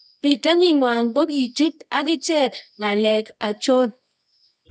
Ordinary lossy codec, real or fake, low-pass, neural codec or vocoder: none; fake; 10.8 kHz; codec, 24 kHz, 0.9 kbps, WavTokenizer, medium music audio release